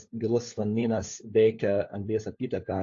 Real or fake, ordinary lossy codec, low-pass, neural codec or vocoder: fake; AAC, 48 kbps; 7.2 kHz; codec, 16 kHz, 4 kbps, FunCodec, trained on LibriTTS, 50 frames a second